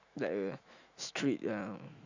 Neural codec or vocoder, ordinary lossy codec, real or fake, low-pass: autoencoder, 48 kHz, 128 numbers a frame, DAC-VAE, trained on Japanese speech; Opus, 64 kbps; fake; 7.2 kHz